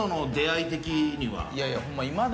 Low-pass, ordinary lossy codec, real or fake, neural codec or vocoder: none; none; real; none